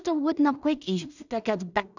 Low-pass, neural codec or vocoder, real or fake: 7.2 kHz; codec, 16 kHz in and 24 kHz out, 0.4 kbps, LongCat-Audio-Codec, two codebook decoder; fake